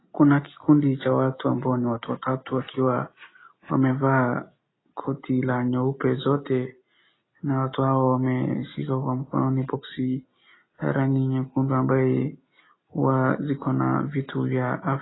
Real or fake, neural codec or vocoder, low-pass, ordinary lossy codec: real; none; 7.2 kHz; AAC, 16 kbps